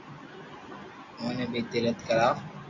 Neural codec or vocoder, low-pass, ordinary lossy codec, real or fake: none; 7.2 kHz; MP3, 48 kbps; real